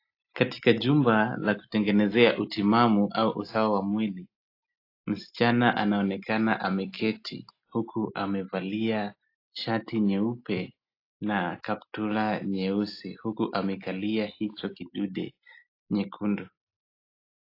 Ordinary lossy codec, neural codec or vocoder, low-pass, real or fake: AAC, 32 kbps; none; 5.4 kHz; real